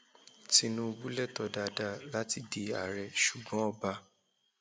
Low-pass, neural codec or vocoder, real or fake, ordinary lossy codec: none; none; real; none